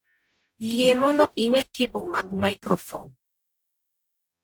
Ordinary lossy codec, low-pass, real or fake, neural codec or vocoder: none; none; fake; codec, 44.1 kHz, 0.9 kbps, DAC